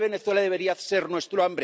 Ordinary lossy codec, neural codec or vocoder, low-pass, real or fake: none; none; none; real